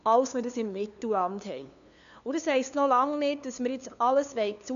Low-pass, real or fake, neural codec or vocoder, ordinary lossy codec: 7.2 kHz; fake; codec, 16 kHz, 2 kbps, FunCodec, trained on LibriTTS, 25 frames a second; none